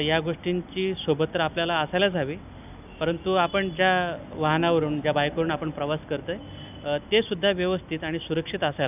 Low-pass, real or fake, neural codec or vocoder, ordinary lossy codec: 3.6 kHz; real; none; none